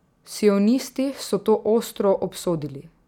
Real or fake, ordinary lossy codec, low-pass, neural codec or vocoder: real; none; 19.8 kHz; none